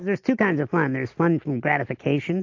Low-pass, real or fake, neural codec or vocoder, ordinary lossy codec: 7.2 kHz; real; none; AAC, 48 kbps